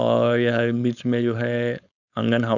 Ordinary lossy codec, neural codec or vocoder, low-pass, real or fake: none; codec, 16 kHz, 4.8 kbps, FACodec; 7.2 kHz; fake